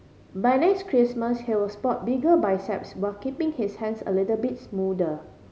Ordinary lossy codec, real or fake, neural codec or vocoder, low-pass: none; real; none; none